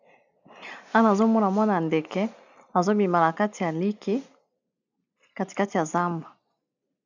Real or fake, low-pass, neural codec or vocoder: real; 7.2 kHz; none